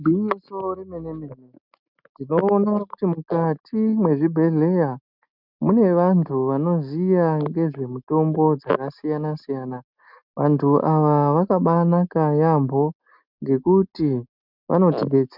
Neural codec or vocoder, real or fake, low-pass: none; real; 5.4 kHz